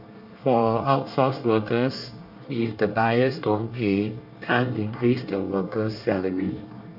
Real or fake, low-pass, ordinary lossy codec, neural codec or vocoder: fake; 5.4 kHz; none; codec, 24 kHz, 1 kbps, SNAC